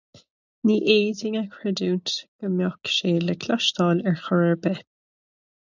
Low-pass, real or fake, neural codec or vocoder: 7.2 kHz; real; none